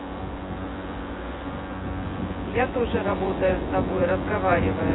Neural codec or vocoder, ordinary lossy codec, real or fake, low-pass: vocoder, 24 kHz, 100 mel bands, Vocos; AAC, 16 kbps; fake; 7.2 kHz